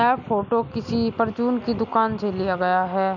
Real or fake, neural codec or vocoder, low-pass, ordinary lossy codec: real; none; 7.2 kHz; none